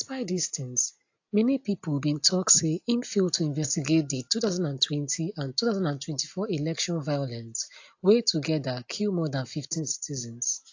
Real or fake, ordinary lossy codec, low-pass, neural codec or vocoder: real; AAC, 48 kbps; 7.2 kHz; none